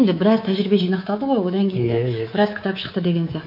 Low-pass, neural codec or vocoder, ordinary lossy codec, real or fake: 5.4 kHz; codec, 24 kHz, 3.1 kbps, DualCodec; none; fake